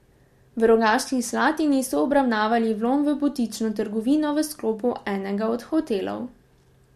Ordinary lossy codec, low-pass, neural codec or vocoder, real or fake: MP3, 64 kbps; 14.4 kHz; none; real